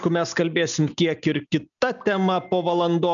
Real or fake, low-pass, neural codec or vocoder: real; 7.2 kHz; none